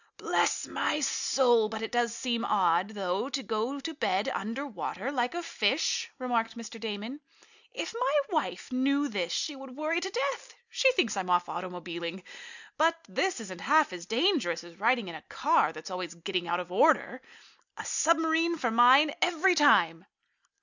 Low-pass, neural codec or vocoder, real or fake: 7.2 kHz; none; real